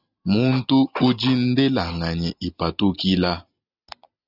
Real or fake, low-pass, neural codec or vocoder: real; 5.4 kHz; none